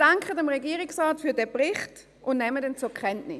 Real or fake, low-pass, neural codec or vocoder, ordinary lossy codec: real; none; none; none